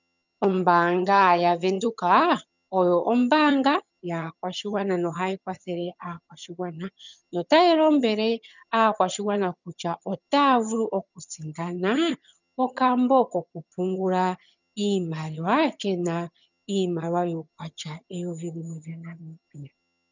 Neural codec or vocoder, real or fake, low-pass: vocoder, 22.05 kHz, 80 mel bands, HiFi-GAN; fake; 7.2 kHz